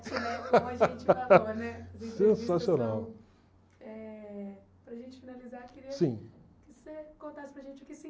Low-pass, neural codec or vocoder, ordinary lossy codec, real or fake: none; none; none; real